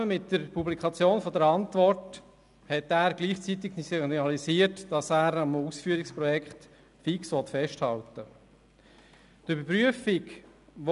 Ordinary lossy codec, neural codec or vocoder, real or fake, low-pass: none; none; real; 10.8 kHz